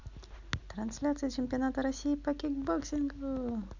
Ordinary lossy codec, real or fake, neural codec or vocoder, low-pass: none; real; none; 7.2 kHz